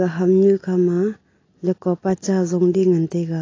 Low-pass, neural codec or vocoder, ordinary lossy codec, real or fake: 7.2 kHz; none; AAC, 32 kbps; real